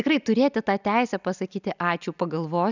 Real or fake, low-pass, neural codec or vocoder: real; 7.2 kHz; none